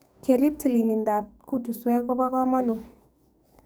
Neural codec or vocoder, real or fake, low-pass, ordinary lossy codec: codec, 44.1 kHz, 2.6 kbps, SNAC; fake; none; none